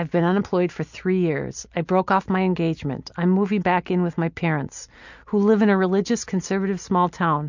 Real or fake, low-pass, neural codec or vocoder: real; 7.2 kHz; none